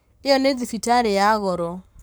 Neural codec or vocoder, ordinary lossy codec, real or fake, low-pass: codec, 44.1 kHz, 7.8 kbps, Pupu-Codec; none; fake; none